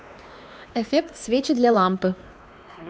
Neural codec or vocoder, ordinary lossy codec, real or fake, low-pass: codec, 16 kHz, 2 kbps, X-Codec, WavLM features, trained on Multilingual LibriSpeech; none; fake; none